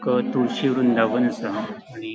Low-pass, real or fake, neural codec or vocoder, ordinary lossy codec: none; real; none; none